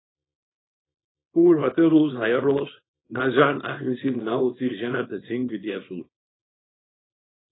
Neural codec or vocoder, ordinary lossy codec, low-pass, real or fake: codec, 24 kHz, 0.9 kbps, WavTokenizer, small release; AAC, 16 kbps; 7.2 kHz; fake